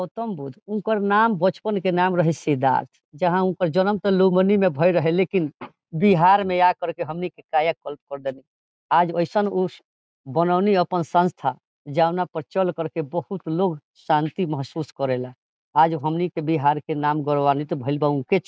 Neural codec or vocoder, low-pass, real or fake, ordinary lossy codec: none; none; real; none